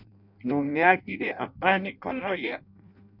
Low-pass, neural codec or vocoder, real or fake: 5.4 kHz; codec, 16 kHz in and 24 kHz out, 0.6 kbps, FireRedTTS-2 codec; fake